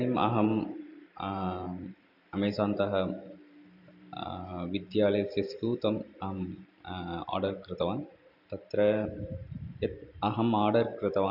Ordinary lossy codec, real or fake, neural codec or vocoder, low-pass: none; fake; vocoder, 44.1 kHz, 128 mel bands every 512 samples, BigVGAN v2; 5.4 kHz